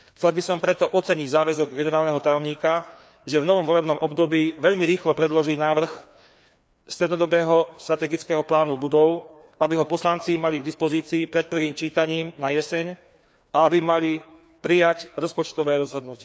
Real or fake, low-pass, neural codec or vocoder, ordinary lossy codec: fake; none; codec, 16 kHz, 2 kbps, FreqCodec, larger model; none